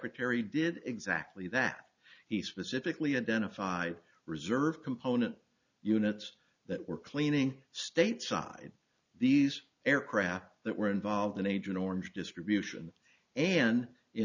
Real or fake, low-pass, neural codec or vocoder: real; 7.2 kHz; none